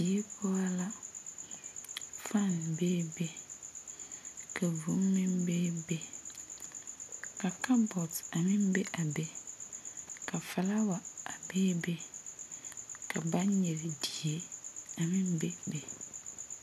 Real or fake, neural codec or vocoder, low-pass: real; none; 14.4 kHz